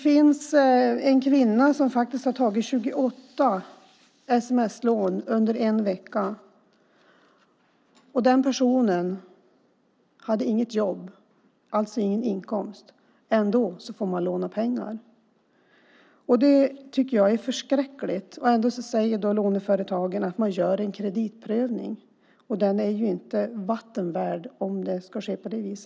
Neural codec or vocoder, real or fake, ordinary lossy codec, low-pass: none; real; none; none